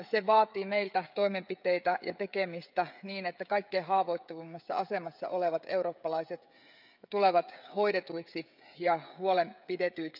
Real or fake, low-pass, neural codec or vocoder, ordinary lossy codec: fake; 5.4 kHz; codec, 16 kHz, 16 kbps, FreqCodec, smaller model; none